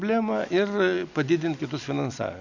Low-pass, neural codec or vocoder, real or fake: 7.2 kHz; none; real